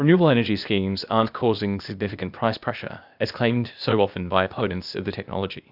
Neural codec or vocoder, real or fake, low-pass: codec, 16 kHz, 0.8 kbps, ZipCodec; fake; 5.4 kHz